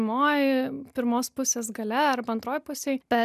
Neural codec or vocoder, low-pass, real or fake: none; 14.4 kHz; real